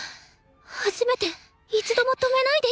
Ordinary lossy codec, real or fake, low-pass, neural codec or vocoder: none; real; none; none